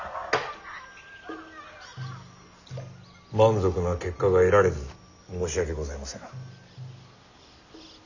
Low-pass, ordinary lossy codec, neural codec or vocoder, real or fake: 7.2 kHz; none; none; real